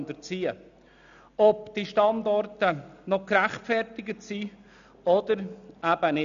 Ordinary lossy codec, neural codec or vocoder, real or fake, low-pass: none; none; real; 7.2 kHz